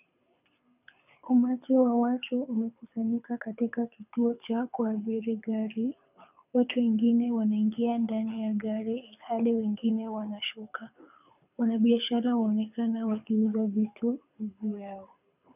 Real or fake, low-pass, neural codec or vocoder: fake; 3.6 kHz; codec, 24 kHz, 6 kbps, HILCodec